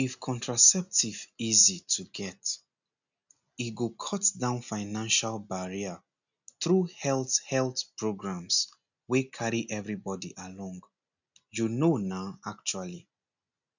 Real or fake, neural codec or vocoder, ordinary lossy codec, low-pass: real; none; none; 7.2 kHz